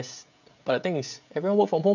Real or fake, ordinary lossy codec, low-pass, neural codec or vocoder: fake; none; 7.2 kHz; codec, 16 kHz, 16 kbps, FreqCodec, smaller model